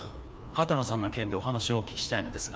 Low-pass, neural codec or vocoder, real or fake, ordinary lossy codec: none; codec, 16 kHz, 2 kbps, FreqCodec, larger model; fake; none